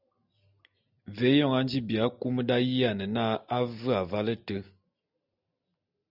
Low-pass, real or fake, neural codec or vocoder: 5.4 kHz; real; none